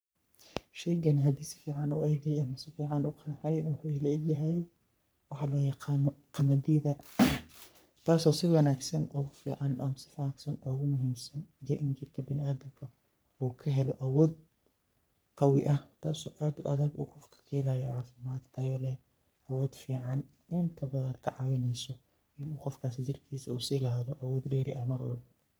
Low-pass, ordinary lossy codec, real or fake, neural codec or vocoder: none; none; fake; codec, 44.1 kHz, 3.4 kbps, Pupu-Codec